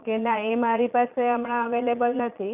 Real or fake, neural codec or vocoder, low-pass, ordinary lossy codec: fake; vocoder, 22.05 kHz, 80 mel bands, Vocos; 3.6 kHz; none